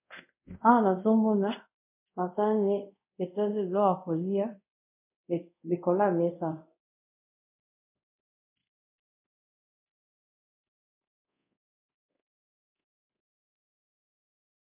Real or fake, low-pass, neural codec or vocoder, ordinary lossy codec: fake; 3.6 kHz; codec, 24 kHz, 0.5 kbps, DualCodec; MP3, 32 kbps